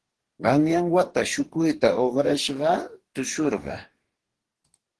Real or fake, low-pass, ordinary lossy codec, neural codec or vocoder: fake; 10.8 kHz; Opus, 16 kbps; codec, 44.1 kHz, 2.6 kbps, DAC